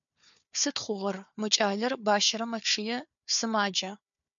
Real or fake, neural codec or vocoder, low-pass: fake; codec, 16 kHz, 4 kbps, FunCodec, trained on Chinese and English, 50 frames a second; 7.2 kHz